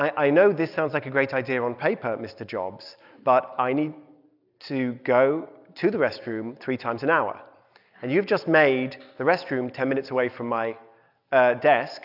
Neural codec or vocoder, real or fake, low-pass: none; real; 5.4 kHz